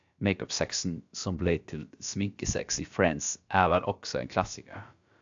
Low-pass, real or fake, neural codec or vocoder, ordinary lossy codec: 7.2 kHz; fake; codec, 16 kHz, about 1 kbps, DyCAST, with the encoder's durations; AAC, 64 kbps